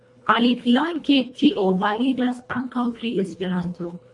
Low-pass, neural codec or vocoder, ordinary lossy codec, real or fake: 10.8 kHz; codec, 24 kHz, 1.5 kbps, HILCodec; MP3, 48 kbps; fake